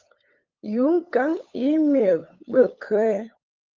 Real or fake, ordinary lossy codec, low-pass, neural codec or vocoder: fake; Opus, 24 kbps; 7.2 kHz; codec, 16 kHz, 8 kbps, FunCodec, trained on LibriTTS, 25 frames a second